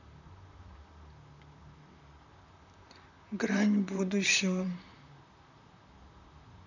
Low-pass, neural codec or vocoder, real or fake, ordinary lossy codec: 7.2 kHz; vocoder, 44.1 kHz, 128 mel bands, Pupu-Vocoder; fake; none